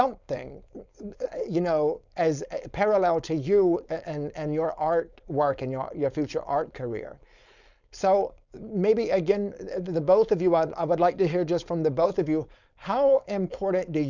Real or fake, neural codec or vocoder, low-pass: fake; codec, 16 kHz, 4.8 kbps, FACodec; 7.2 kHz